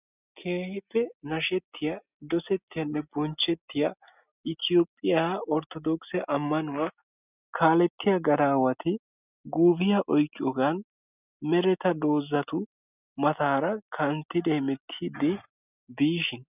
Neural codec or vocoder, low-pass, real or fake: none; 3.6 kHz; real